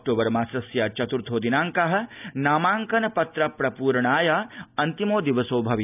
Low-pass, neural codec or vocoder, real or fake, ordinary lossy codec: 3.6 kHz; none; real; none